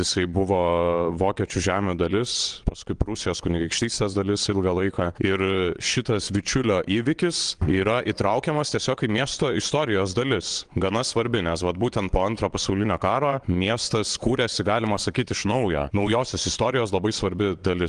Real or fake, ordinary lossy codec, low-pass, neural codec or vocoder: fake; Opus, 24 kbps; 9.9 kHz; vocoder, 22.05 kHz, 80 mel bands, WaveNeXt